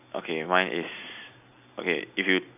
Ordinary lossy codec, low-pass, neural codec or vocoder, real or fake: none; 3.6 kHz; none; real